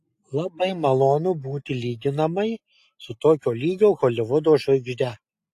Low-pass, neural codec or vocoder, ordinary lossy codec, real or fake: 14.4 kHz; none; AAC, 64 kbps; real